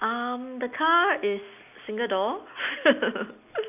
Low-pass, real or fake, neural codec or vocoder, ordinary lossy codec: 3.6 kHz; real; none; none